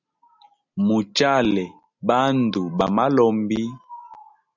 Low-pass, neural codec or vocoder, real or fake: 7.2 kHz; none; real